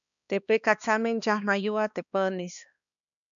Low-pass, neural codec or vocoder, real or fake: 7.2 kHz; codec, 16 kHz, 2 kbps, X-Codec, HuBERT features, trained on balanced general audio; fake